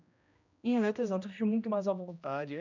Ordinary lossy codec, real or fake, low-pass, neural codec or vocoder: none; fake; 7.2 kHz; codec, 16 kHz, 1 kbps, X-Codec, HuBERT features, trained on general audio